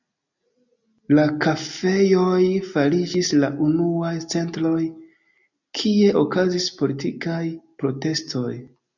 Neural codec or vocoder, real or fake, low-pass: none; real; 7.2 kHz